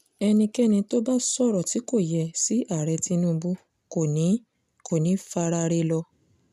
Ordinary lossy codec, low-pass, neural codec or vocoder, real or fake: none; 14.4 kHz; none; real